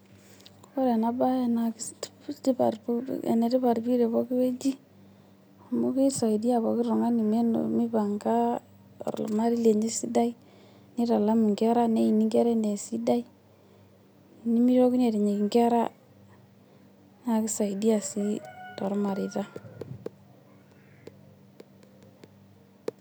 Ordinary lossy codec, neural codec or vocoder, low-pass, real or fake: none; none; none; real